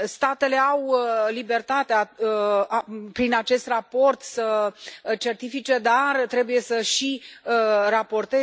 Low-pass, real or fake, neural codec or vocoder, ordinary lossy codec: none; real; none; none